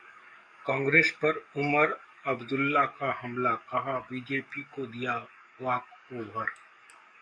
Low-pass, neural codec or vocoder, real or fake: 9.9 kHz; codec, 44.1 kHz, 7.8 kbps, DAC; fake